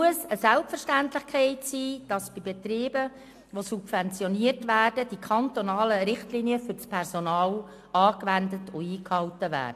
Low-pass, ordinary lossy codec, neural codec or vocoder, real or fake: 14.4 kHz; AAC, 64 kbps; none; real